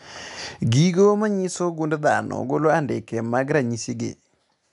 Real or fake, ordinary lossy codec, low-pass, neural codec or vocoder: real; none; 10.8 kHz; none